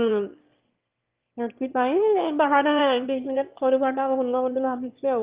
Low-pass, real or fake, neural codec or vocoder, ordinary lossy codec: 3.6 kHz; fake; autoencoder, 22.05 kHz, a latent of 192 numbers a frame, VITS, trained on one speaker; Opus, 32 kbps